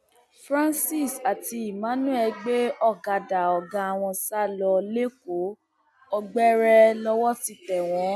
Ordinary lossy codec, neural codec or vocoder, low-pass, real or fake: none; none; none; real